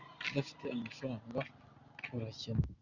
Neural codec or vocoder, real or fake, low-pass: none; real; 7.2 kHz